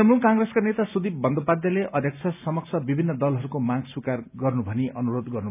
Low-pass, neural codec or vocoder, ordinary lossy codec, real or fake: 3.6 kHz; none; none; real